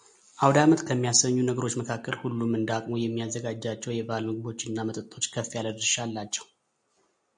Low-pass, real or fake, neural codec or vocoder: 9.9 kHz; real; none